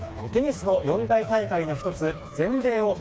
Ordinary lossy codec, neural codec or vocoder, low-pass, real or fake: none; codec, 16 kHz, 2 kbps, FreqCodec, smaller model; none; fake